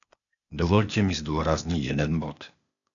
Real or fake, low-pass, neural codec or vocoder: fake; 7.2 kHz; codec, 16 kHz, 0.8 kbps, ZipCodec